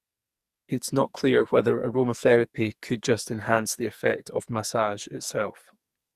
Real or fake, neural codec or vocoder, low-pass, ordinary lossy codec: fake; codec, 44.1 kHz, 2.6 kbps, SNAC; 14.4 kHz; Opus, 64 kbps